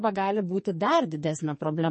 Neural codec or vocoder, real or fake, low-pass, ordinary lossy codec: codec, 44.1 kHz, 2.6 kbps, SNAC; fake; 10.8 kHz; MP3, 32 kbps